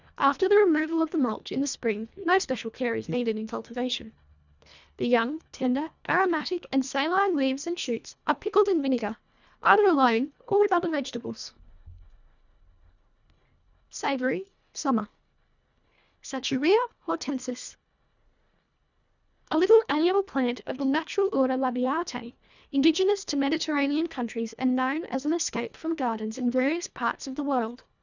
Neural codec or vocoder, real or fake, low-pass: codec, 24 kHz, 1.5 kbps, HILCodec; fake; 7.2 kHz